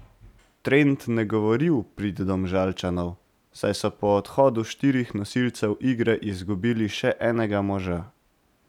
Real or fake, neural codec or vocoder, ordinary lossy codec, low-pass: real; none; none; 19.8 kHz